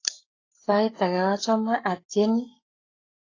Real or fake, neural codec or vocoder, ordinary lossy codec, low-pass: fake; codec, 44.1 kHz, 7.8 kbps, Pupu-Codec; AAC, 32 kbps; 7.2 kHz